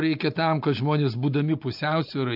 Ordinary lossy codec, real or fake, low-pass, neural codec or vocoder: AAC, 48 kbps; real; 5.4 kHz; none